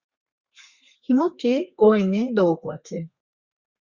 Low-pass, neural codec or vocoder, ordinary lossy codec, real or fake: 7.2 kHz; codec, 32 kHz, 1.9 kbps, SNAC; Opus, 64 kbps; fake